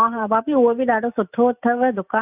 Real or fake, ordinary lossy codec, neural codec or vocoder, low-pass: real; none; none; 3.6 kHz